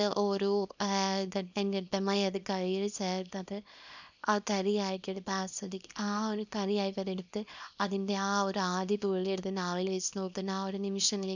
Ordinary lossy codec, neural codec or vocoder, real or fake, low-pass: none; codec, 24 kHz, 0.9 kbps, WavTokenizer, small release; fake; 7.2 kHz